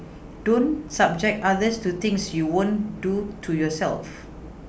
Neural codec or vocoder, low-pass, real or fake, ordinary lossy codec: none; none; real; none